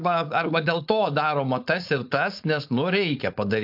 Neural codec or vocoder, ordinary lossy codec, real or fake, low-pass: codec, 16 kHz, 4.8 kbps, FACodec; AAC, 48 kbps; fake; 5.4 kHz